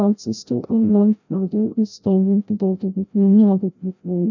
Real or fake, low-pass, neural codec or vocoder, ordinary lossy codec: fake; 7.2 kHz; codec, 16 kHz, 0.5 kbps, FreqCodec, larger model; none